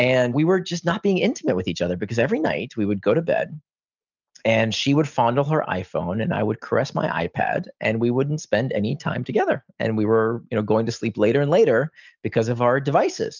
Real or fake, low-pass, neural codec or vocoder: real; 7.2 kHz; none